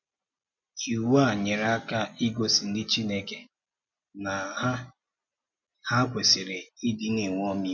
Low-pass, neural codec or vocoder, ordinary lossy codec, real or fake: 7.2 kHz; none; MP3, 64 kbps; real